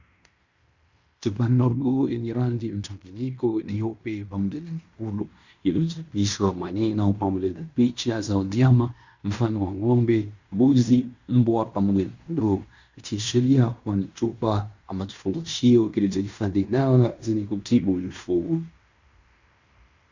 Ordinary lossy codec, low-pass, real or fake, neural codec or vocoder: Opus, 64 kbps; 7.2 kHz; fake; codec, 16 kHz in and 24 kHz out, 0.9 kbps, LongCat-Audio-Codec, fine tuned four codebook decoder